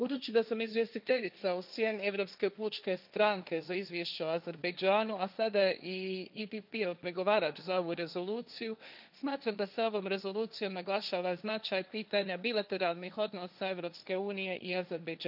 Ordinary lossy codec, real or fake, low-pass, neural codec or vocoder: none; fake; 5.4 kHz; codec, 16 kHz, 1.1 kbps, Voila-Tokenizer